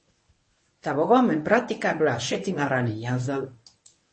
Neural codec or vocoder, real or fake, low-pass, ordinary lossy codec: codec, 24 kHz, 0.9 kbps, WavTokenizer, medium speech release version 1; fake; 9.9 kHz; MP3, 32 kbps